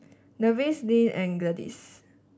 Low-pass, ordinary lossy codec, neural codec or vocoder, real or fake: none; none; none; real